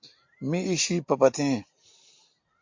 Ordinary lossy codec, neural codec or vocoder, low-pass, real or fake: MP3, 48 kbps; none; 7.2 kHz; real